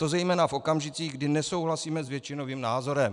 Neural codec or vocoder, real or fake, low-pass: none; real; 10.8 kHz